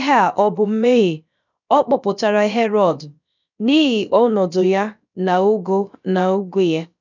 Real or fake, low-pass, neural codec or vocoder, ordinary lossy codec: fake; 7.2 kHz; codec, 16 kHz, about 1 kbps, DyCAST, with the encoder's durations; none